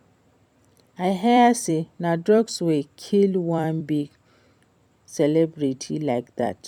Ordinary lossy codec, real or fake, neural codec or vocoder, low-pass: none; fake; vocoder, 44.1 kHz, 128 mel bands every 256 samples, BigVGAN v2; 19.8 kHz